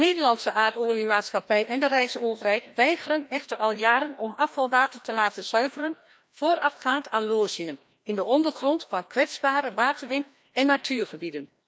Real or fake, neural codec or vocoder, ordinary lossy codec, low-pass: fake; codec, 16 kHz, 1 kbps, FreqCodec, larger model; none; none